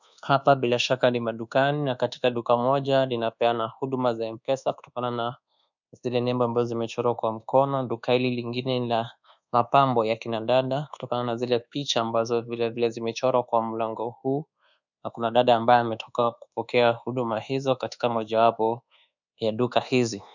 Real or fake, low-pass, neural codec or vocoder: fake; 7.2 kHz; codec, 24 kHz, 1.2 kbps, DualCodec